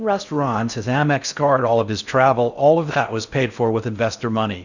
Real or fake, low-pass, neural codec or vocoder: fake; 7.2 kHz; codec, 16 kHz in and 24 kHz out, 0.6 kbps, FocalCodec, streaming, 4096 codes